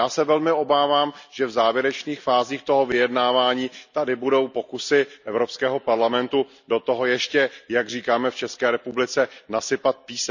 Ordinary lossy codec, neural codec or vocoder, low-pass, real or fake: none; none; 7.2 kHz; real